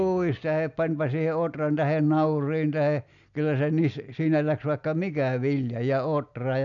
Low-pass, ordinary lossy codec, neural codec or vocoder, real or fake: 7.2 kHz; none; none; real